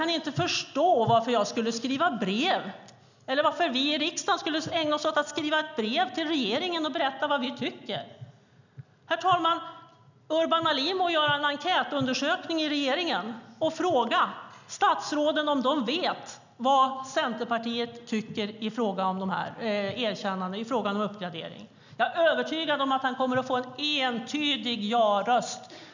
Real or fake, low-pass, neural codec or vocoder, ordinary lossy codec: real; 7.2 kHz; none; none